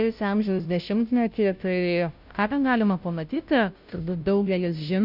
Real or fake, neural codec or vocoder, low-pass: fake; codec, 16 kHz, 0.5 kbps, FunCodec, trained on Chinese and English, 25 frames a second; 5.4 kHz